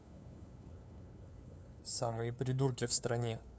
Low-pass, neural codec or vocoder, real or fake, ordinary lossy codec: none; codec, 16 kHz, 2 kbps, FunCodec, trained on LibriTTS, 25 frames a second; fake; none